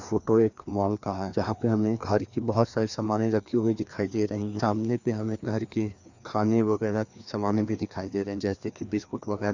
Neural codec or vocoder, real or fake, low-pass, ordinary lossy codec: codec, 16 kHz, 2 kbps, FreqCodec, larger model; fake; 7.2 kHz; none